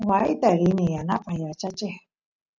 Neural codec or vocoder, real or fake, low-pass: none; real; 7.2 kHz